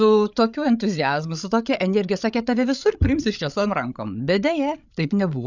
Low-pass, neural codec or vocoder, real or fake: 7.2 kHz; codec, 16 kHz, 8 kbps, FreqCodec, larger model; fake